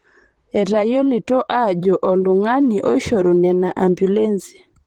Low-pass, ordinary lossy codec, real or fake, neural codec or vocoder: 19.8 kHz; Opus, 16 kbps; fake; vocoder, 44.1 kHz, 128 mel bands, Pupu-Vocoder